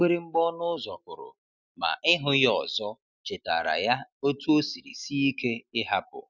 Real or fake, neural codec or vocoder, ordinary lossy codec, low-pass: real; none; none; 7.2 kHz